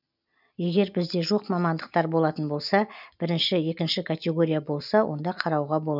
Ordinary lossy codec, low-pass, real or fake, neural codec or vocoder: none; 5.4 kHz; real; none